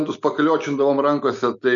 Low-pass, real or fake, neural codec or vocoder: 7.2 kHz; real; none